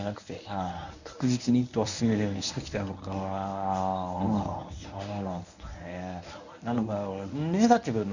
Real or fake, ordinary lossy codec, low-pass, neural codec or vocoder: fake; none; 7.2 kHz; codec, 24 kHz, 0.9 kbps, WavTokenizer, medium speech release version 1